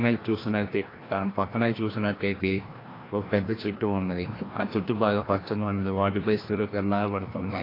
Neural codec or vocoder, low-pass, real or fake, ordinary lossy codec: codec, 16 kHz, 1 kbps, FreqCodec, larger model; 5.4 kHz; fake; AAC, 24 kbps